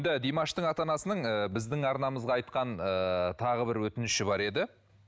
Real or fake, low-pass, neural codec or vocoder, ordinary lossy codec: real; none; none; none